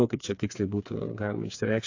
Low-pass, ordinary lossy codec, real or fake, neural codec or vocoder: 7.2 kHz; AAC, 48 kbps; fake; codec, 16 kHz, 4 kbps, FreqCodec, smaller model